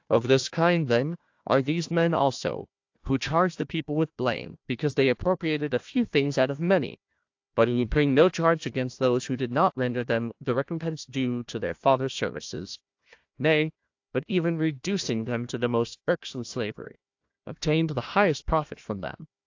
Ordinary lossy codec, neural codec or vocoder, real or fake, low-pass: AAC, 48 kbps; codec, 16 kHz, 1 kbps, FunCodec, trained on Chinese and English, 50 frames a second; fake; 7.2 kHz